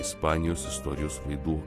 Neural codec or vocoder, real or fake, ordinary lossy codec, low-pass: none; real; AAC, 48 kbps; 14.4 kHz